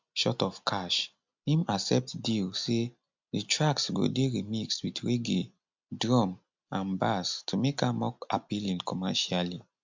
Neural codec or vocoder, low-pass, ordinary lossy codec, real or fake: none; 7.2 kHz; MP3, 64 kbps; real